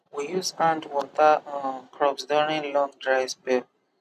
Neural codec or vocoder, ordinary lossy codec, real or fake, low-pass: none; none; real; 14.4 kHz